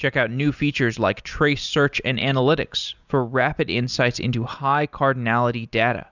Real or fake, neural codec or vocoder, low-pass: real; none; 7.2 kHz